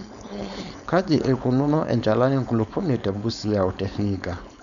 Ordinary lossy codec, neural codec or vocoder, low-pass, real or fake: none; codec, 16 kHz, 4.8 kbps, FACodec; 7.2 kHz; fake